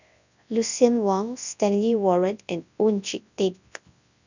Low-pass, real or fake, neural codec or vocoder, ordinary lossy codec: 7.2 kHz; fake; codec, 24 kHz, 0.9 kbps, WavTokenizer, large speech release; none